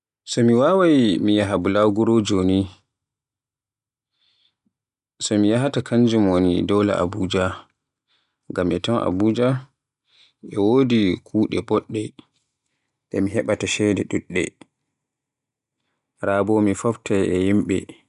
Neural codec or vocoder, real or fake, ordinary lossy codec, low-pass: none; real; none; 10.8 kHz